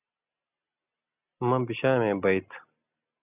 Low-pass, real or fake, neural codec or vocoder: 3.6 kHz; real; none